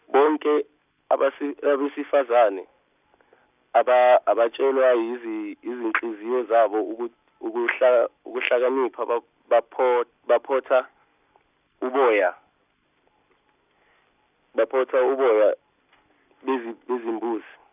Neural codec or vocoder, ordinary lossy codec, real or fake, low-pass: none; none; real; 3.6 kHz